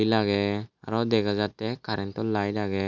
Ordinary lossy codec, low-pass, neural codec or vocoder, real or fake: none; 7.2 kHz; none; real